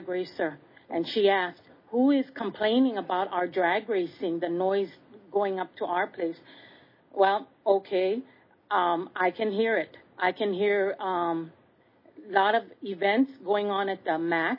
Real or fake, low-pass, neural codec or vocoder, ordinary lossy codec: real; 5.4 kHz; none; MP3, 24 kbps